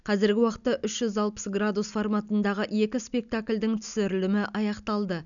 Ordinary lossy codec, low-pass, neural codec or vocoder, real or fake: none; 7.2 kHz; none; real